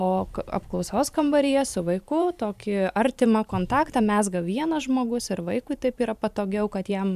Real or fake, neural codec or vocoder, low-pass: fake; vocoder, 44.1 kHz, 128 mel bands every 512 samples, BigVGAN v2; 14.4 kHz